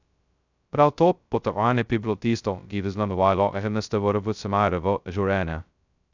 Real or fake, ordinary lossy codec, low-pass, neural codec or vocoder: fake; none; 7.2 kHz; codec, 16 kHz, 0.2 kbps, FocalCodec